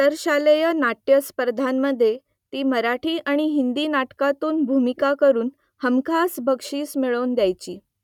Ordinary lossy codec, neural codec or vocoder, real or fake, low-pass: none; none; real; 19.8 kHz